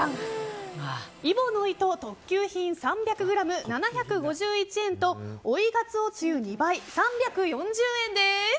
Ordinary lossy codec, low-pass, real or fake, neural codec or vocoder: none; none; real; none